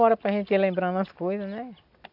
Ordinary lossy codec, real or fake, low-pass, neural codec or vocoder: none; real; 5.4 kHz; none